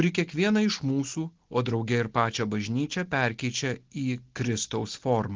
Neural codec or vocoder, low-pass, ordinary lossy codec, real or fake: none; 7.2 kHz; Opus, 16 kbps; real